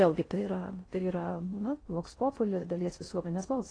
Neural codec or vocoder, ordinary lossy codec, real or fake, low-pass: codec, 16 kHz in and 24 kHz out, 0.6 kbps, FocalCodec, streaming, 4096 codes; AAC, 32 kbps; fake; 9.9 kHz